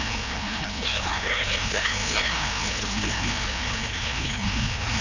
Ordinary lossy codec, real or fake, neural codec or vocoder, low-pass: none; fake; codec, 16 kHz, 1 kbps, FreqCodec, larger model; 7.2 kHz